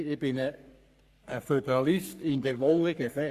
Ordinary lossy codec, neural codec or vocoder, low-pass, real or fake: Opus, 64 kbps; codec, 44.1 kHz, 3.4 kbps, Pupu-Codec; 14.4 kHz; fake